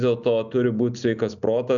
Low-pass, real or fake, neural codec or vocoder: 7.2 kHz; real; none